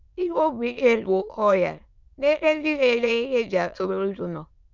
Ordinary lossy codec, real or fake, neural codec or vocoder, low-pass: none; fake; autoencoder, 22.05 kHz, a latent of 192 numbers a frame, VITS, trained on many speakers; 7.2 kHz